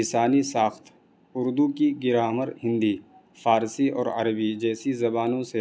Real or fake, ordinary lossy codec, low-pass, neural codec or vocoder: real; none; none; none